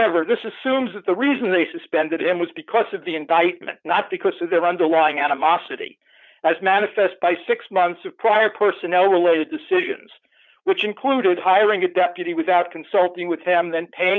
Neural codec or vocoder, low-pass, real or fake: vocoder, 44.1 kHz, 80 mel bands, Vocos; 7.2 kHz; fake